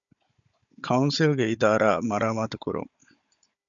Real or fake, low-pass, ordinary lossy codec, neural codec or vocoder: fake; 7.2 kHz; MP3, 96 kbps; codec, 16 kHz, 16 kbps, FunCodec, trained on Chinese and English, 50 frames a second